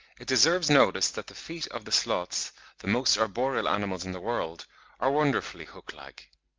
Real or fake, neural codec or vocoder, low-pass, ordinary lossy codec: real; none; 7.2 kHz; Opus, 24 kbps